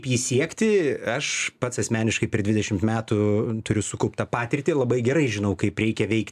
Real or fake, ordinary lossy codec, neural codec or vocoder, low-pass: real; AAC, 96 kbps; none; 14.4 kHz